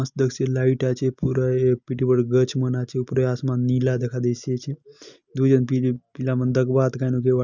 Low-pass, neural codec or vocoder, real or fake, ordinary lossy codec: 7.2 kHz; none; real; none